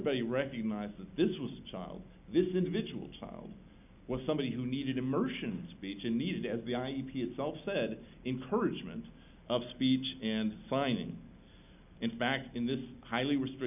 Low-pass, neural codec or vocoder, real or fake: 3.6 kHz; none; real